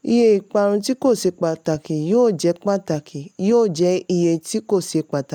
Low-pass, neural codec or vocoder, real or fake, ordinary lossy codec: 19.8 kHz; none; real; none